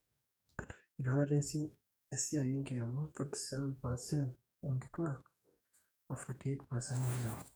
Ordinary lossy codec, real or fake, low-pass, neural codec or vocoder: none; fake; none; codec, 44.1 kHz, 2.6 kbps, DAC